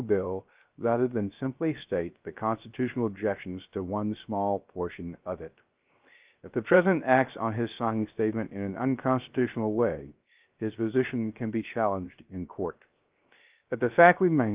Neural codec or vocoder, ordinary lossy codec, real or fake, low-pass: codec, 16 kHz, 0.3 kbps, FocalCodec; Opus, 24 kbps; fake; 3.6 kHz